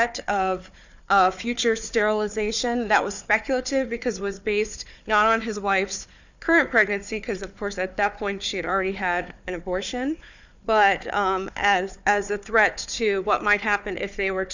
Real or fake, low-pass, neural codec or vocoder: fake; 7.2 kHz; codec, 16 kHz, 4 kbps, FunCodec, trained on Chinese and English, 50 frames a second